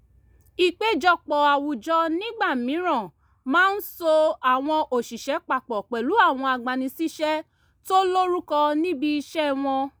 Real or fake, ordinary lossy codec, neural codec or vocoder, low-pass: real; none; none; none